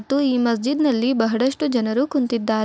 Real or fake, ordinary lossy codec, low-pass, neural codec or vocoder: real; none; none; none